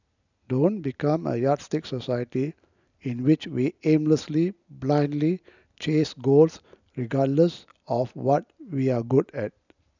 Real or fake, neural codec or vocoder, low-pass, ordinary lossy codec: real; none; 7.2 kHz; none